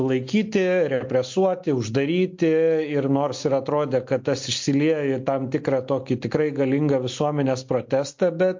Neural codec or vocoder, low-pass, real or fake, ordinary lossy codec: none; 7.2 kHz; real; MP3, 48 kbps